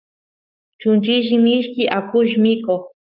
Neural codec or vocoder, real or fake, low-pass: codec, 44.1 kHz, 7.8 kbps, Pupu-Codec; fake; 5.4 kHz